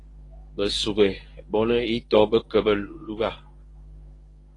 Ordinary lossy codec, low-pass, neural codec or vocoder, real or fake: AAC, 32 kbps; 10.8 kHz; codec, 24 kHz, 0.9 kbps, WavTokenizer, medium speech release version 1; fake